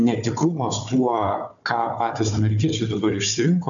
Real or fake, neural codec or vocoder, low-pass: fake; codec, 16 kHz, 4 kbps, FunCodec, trained on Chinese and English, 50 frames a second; 7.2 kHz